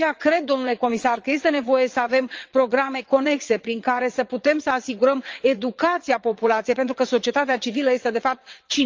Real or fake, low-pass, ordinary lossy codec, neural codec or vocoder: fake; 7.2 kHz; Opus, 24 kbps; vocoder, 22.05 kHz, 80 mel bands, WaveNeXt